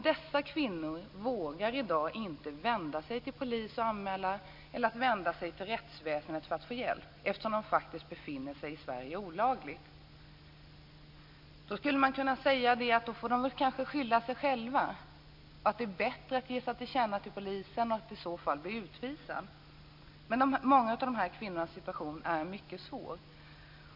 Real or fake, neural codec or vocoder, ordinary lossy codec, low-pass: real; none; MP3, 48 kbps; 5.4 kHz